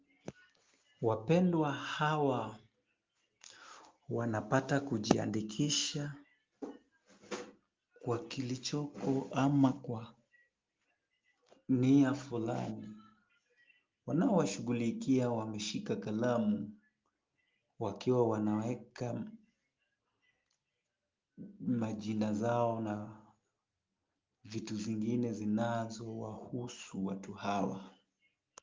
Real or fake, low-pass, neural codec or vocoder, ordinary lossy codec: real; 7.2 kHz; none; Opus, 32 kbps